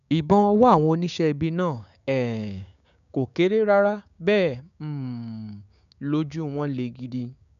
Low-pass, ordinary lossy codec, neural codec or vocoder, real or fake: 7.2 kHz; none; codec, 16 kHz, 6 kbps, DAC; fake